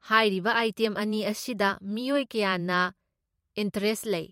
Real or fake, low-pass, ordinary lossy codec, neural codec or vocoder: fake; 19.8 kHz; MP3, 64 kbps; vocoder, 44.1 kHz, 128 mel bands every 512 samples, BigVGAN v2